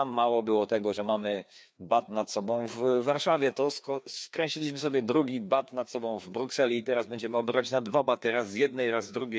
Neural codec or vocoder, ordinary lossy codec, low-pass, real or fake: codec, 16 kHz, 2 kbps, FreqCodec, larger model; none; none; fake